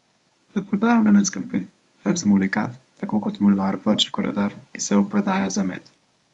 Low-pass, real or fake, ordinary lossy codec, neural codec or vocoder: 10.8 kHz; fake; none; codec, 24 kHz, 0.9 kbps, WavTokenizer, medium speech release version 1